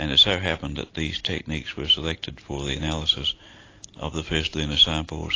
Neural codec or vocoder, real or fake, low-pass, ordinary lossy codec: none; real; 7.2 kHz; AAC, 32 kbps